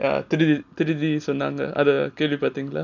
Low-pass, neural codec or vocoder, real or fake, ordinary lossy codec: 7.2 kHz; none; real; none